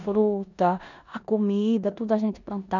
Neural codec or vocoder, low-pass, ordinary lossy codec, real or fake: codec, 16 kHz in and 24 kHz out, 0.9 kbps, LongCat-Audio-Codec, four codebook decoder; 7.2 kHz; none; fake